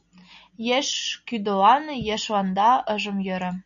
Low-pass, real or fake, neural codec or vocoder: 7.2 kHz; real; none